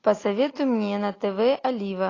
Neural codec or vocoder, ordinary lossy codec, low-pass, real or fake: none; AAC, 32 kbps; 7.2 kHz; real